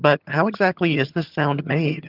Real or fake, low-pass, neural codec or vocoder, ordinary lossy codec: fake; 5.4 kHz; vocoder, 22.05 kHz, 80 mel bands, HiFi-GAN; Opus, 32 kbps